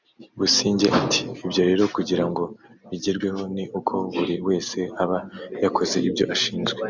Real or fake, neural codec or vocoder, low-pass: real; none; 7.2 kHz